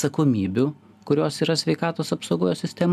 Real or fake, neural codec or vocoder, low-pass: real; none; 14.4 kHz